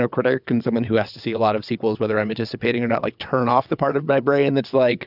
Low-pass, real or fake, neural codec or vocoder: 5.4 kHz; fake; vocoder, 22.05 kHz, 80 mel bands, WaveNeXt